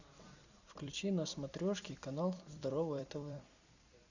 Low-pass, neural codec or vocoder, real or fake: 7.2 kHz; vocoder, 44.1 kHz, 128 mel bands every 256 samples, BigVGAN v2; fake